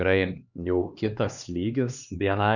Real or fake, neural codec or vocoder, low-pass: fake; codec, 16 kHz, 2 kbps, X-Codec, HuBERT features, trained on LibriSpeech; 7.2 kHz